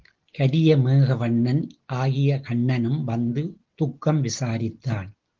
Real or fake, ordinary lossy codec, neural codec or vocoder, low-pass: real; Opus, 16 kbps; none; 7.2 kHz